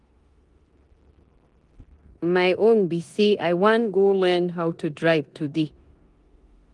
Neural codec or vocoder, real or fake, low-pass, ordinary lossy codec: codec, 16 kHz in and 24 kHz out, 0.9 kbps, LongCat-Audio-Codec, four codebook decoder; fake; 10.8 kHz; Opus, 24 kbps